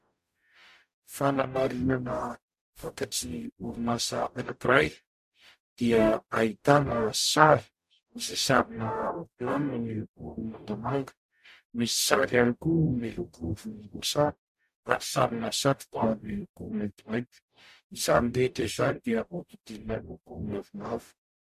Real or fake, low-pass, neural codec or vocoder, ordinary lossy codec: fake; 14.4 kHz; codec, 44.1 kHz, 0.9 kbps, DAC; MP3, 64 kbps